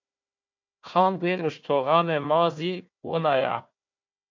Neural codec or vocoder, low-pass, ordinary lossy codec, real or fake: codec, 16 kHz, 1 kbps, FunCodec, trained on Chinese and English, 50 frames a second; 7.2 kHz; MP3, 48 kbps; fake